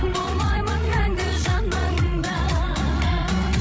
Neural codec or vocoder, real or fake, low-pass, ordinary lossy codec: codec, 16 kHz, 8 kbps, FreqCodec, larger model; fake; none; none